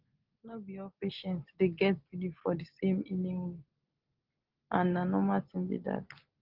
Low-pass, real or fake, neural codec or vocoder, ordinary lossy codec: 5.4 kHz; real; none; Opus, 16 kbps